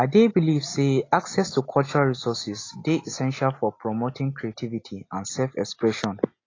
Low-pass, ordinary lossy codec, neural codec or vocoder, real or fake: 7.2 kHz; AAC, 32 kbps; none; real